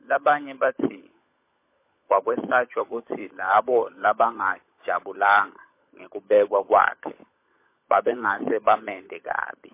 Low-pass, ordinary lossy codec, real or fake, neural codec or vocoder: 3.6 kHz; MP3, 24 kbps; fake; codec, 16 kHz, 16 kbps, FunCodec, trained on LibriTTS, 50 frames a second